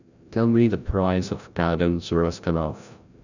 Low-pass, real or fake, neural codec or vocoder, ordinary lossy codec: 7.2 kHz; fake; codec, 16 kHz, 0.5 kbps, FreqCodec, larger model; none